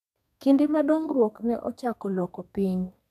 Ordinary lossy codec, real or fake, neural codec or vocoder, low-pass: none; fake; codec, 32 kHz, 1.9 kbps, SNAC; 14.4 kHz